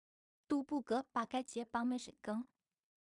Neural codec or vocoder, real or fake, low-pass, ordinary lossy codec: codec, 16 kHz in and 24 kHz out, 0.4 kbps, LongCat-Audio-Codec, two codebook decoder; fake; 10.8 kHz; MP3, 96 kbps